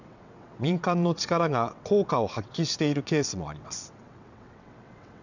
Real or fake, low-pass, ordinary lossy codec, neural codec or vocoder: fake; 7.2 kHz; none; vocoder, 22.05 kHz, 80 mel bands, WaveNeXt